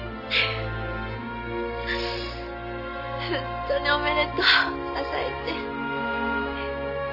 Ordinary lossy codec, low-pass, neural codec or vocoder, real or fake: AAC, 48 kbps; 5.4 kHz; none; real